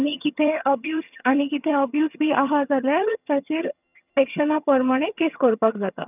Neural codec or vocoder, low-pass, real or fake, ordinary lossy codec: vocoder, 22.05 kHz, 80 mel bands, HiFi-GAN; 3.6 kHz; fake; none